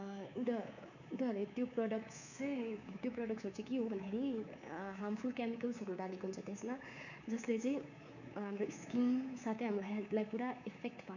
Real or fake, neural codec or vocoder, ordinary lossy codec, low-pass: fake; codec, 24 kHz, 3.1 kbps, DualCodec; none; 7.2 kHz